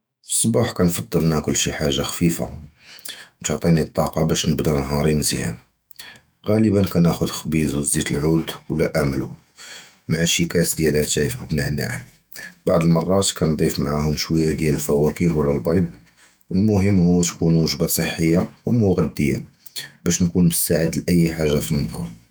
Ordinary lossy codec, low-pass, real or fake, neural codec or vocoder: none; none; fake; autoencoder, 48 kHz, 128 numbers a frame, DAC-VAE, trained on Japanese speech